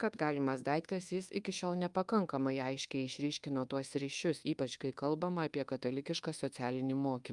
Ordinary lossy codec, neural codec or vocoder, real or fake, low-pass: Opus, 32 kbps; codec, 24 kHz, 1.2 kbps, DualCodec; fake; 10.8 kHz